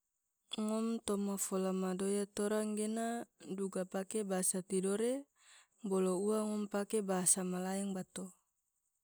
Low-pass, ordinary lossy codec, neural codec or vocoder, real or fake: none; none; none; real